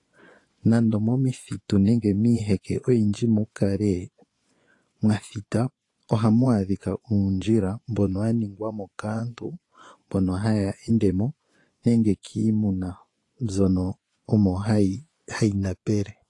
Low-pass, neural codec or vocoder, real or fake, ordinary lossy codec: 10.8 kHz; vocoder, 24 kHz, 100 mel bands, Vocos; fake; AAC, 48 kbps